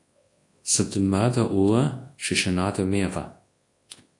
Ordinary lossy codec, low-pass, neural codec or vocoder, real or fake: AAC, 48 kbps; 10.8 kHz; codec, 24 kHz, 0.9 kbps, WavTokenizer, large speech release; fake